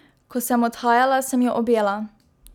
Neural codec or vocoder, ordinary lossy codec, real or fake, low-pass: none; none; real; 19.8 kHz